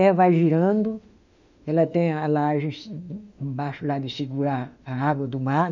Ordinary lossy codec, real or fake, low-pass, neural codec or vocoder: none; fake; 7.2 kHz; autoencoder, 48 kHz, 32 numbers a frame, DAC-VAE, trained on Japanese speech